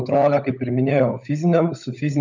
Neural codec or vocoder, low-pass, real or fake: codec, 16 kHz, 16 kbps, FunCodec, trained on LibriTTS, 50 frames a second; 7.2 kHz; fake